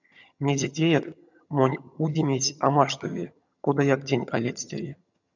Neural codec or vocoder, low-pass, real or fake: vocoder, 22.05 kHz, 80 mel bands, HiFi-GAN; 7.2 kHz; fake